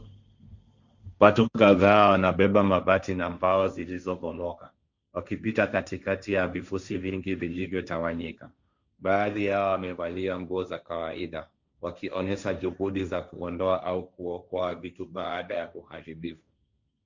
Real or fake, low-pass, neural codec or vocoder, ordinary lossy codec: fake; 7.2 kHz; codec, 16 kHz, 1.1 kbps, Voila-Tokenizer; Opus, 64 kbps